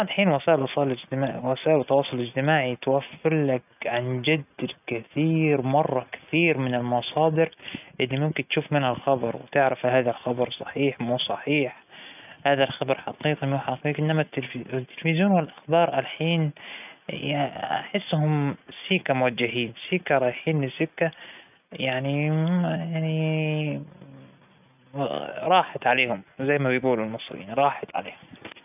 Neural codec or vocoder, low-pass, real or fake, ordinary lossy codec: none; 3.6 kHz; real; none